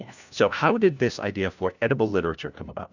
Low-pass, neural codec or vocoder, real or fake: 7.2 kHz; codec, 16 kHz, 1 kbps, FunCodec, trained on LibriTTS, 50 frames a second; fake